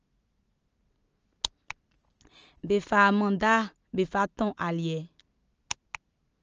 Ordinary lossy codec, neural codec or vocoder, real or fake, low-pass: Opus, 24 kbps; none; real; 7.2 kHz